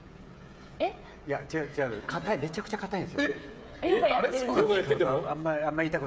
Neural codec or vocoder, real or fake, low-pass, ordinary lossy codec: codec, 16 kHz, 16 kbps, FreqCodec, smaller model; fake; none; none